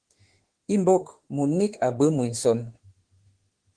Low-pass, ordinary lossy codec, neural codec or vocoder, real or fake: 9.9 kHz; Opus, 16 kbps; autoencoder, 48 kHz, 32 numbers a frame, DAC-VAE, trained on Japanese speech; fake